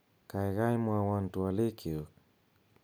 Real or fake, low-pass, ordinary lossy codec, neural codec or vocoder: real; none; none; none